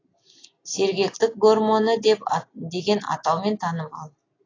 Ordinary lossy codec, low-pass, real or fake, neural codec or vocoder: AAC, 32 kbps; 7.2 kHz; real; none